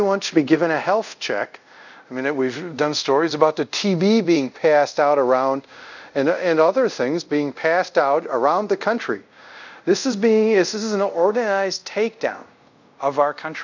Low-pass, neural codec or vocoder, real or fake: 7.2 kHz; codec, 24 kHz, 0.5 kbps, DualCodec; fake